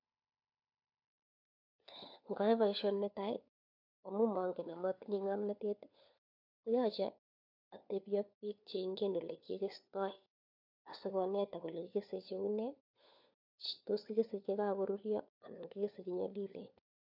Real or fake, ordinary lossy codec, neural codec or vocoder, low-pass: fake; MP3, 48 kbps; codec, 16 kHz, 4 kbps, FunCodec, trained on LibriTTS, 50 frames a second; 5.4 kHz